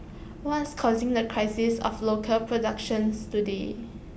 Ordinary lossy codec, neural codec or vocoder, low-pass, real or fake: none; none; none; real